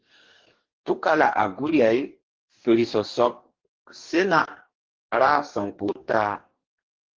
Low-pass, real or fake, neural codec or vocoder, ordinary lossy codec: 7.2 kHz; fake; codec, 44.1 kHz, 2.6 kbps, DAC; Opus, 16 kbps